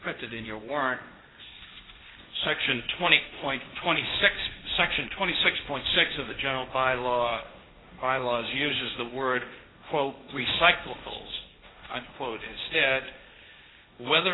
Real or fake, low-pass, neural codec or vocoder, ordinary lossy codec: fake; 7.2 kHz; codec, 16 kHz, 1.1 kbps, Voila-Tokenizer; AAC, 16 kbps